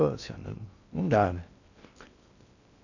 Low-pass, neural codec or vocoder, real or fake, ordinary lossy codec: 7.2 kHz; codec, 16 kHz in and 24 kHz out, 0.6 kbps, FocalCodec, streaming, 2048 codes; fake; none